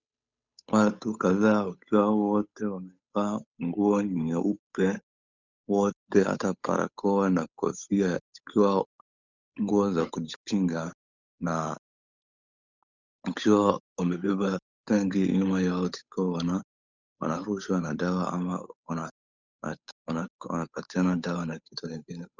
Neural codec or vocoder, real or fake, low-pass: codec, 16 kHz, 8 kbps, FunCodec, trained on Chinese and English, 25 frames a second; fake; 7.2 kHz